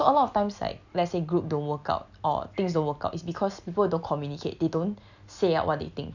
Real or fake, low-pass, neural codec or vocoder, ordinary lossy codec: real; 7.2 kHz; none; none